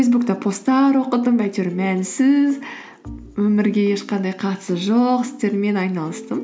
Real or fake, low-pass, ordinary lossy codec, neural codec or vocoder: real; none; none; none